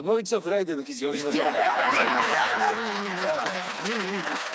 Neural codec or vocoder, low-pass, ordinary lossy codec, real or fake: codec, 16 kHz, 2 kbps, FreqCodec, smaller model; none; none; fake